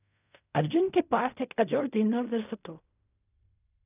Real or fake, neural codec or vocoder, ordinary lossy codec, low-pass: fake; codec, 16 kHz in and 24 kHz out, 0.4 kbps, LongCat-Audio-Codec, fine tuned four codebook decoder; none; 3.6 kHz